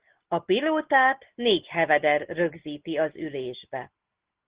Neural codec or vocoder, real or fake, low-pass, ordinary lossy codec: none; real; 3.6 kHz; Opus, 16 kbps